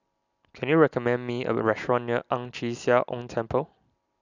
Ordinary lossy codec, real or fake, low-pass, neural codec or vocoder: none; real; 7.2 kHz; none